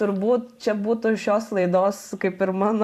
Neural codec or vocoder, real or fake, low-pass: vocoder, 44.1 kHz, 128 mel bands every 512 samples, BigVGAN v2; fake; 14.4 kHz